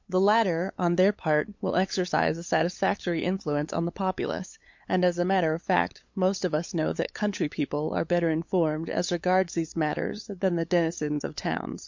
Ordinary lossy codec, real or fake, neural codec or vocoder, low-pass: MP3, 48 kbps; fake; codec, 16 kHz, 8 kbps, FunCodec, trained on LibriTTS, 25 frames a second; 7.2 kHz